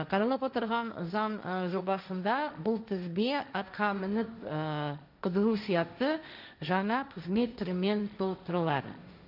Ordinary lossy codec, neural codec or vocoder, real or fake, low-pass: none; codec, 16 kHz, 1.1 kbps, Voila-Tokenizer; fake; 5.4 kHz